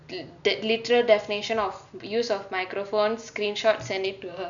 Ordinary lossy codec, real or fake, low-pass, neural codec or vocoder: none; real; 7.2 kHz; none